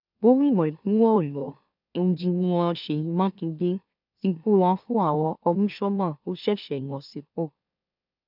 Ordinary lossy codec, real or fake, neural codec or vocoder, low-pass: none; fake; autoencoder, 44.1 kHz, a latent of 192 numbers a frame, MeloTTS; 5.4 kHz